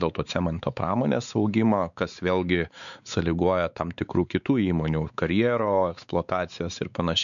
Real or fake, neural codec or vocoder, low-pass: fake; codec, 16 kHz, 4 kbps, X-Codec, WavLM features, trained on Multilingual LibriSpeech; 7.2 kHz